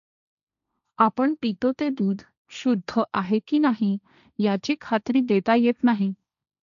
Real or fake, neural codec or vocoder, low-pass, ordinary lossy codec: fake; codec, 16 kHz, 1.1 kbps, Voila-Tokenizer; 7.2 kHz; none